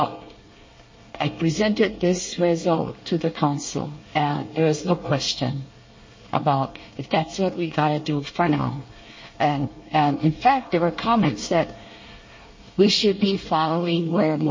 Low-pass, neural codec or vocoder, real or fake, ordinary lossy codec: 7.2 kHz; codec, 24 kHz, 1 kbps, SNAC; fake; MP3, 32 kbps